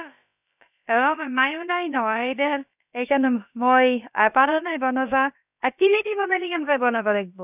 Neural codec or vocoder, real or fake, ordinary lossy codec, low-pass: codec, 16 kHz, about 1 kbps, DyCAST, with the encoder's durations; fake; none; 3.6 kHz